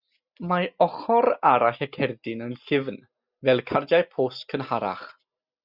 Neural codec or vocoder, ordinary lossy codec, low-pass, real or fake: none; Opus, 64 kbps; 5.4 kHz; real